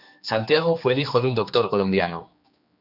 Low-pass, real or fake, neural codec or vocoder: 5.4 kHz; fake; codec, 16 kHz, 2 kbps, X-Codec, HuBERT features, trained on general audio